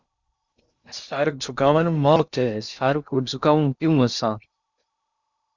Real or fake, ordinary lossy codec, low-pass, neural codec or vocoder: fake; Opus, 64 kbps; 7.2 kHz; codec, 16 kHz in and 24 kHz out, 0.6 kbps, FocalCodec, streaming, 2048 codes